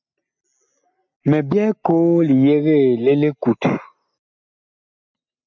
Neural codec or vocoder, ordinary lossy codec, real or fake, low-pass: none; MP3, 64 kbps; real; 7.2 kHz